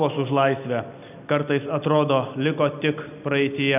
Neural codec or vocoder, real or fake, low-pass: none; real; 3.6 kHz